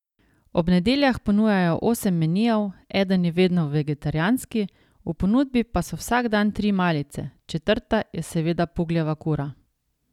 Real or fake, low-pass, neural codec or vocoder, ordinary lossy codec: real; 19.8 kHz; none; none